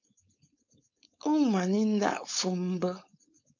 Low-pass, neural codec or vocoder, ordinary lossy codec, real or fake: 7.2 kHz; codec, 16 kHz, 4.8 kbps, FACodec; AAC, 48 kbps; fake